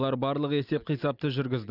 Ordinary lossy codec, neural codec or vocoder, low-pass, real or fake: none; none; 5.4 kHz; real